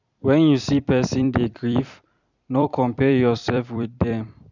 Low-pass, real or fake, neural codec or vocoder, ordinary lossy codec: 7.2 kHz; fake; vocoder, 44.1 kHz, 128 mel bands every 256 samples, BigVGAN v2; none